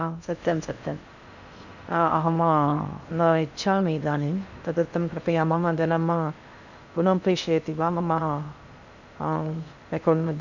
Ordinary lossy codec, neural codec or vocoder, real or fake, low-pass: none; codec, 16 kHz in and 24 kHz out, 0.6 kbps, FocalCodec, streaming, 4096 codes; fake; 7.2 kHz